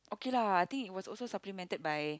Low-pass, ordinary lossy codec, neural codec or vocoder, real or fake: none; none; none; real